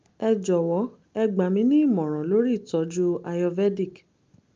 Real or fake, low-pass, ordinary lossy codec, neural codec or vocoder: real; 7.2 kHz; Opus, 24 kbps; none